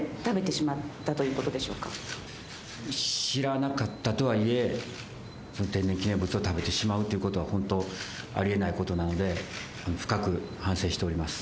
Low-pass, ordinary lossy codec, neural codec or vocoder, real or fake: none; none; none; real